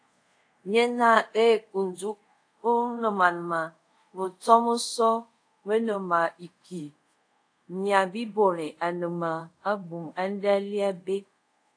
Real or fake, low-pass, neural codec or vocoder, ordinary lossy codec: fake; 9.9 kHz; codec, 24 kHz, 0.5 kbps, DualCodec; AAC, 48 kbps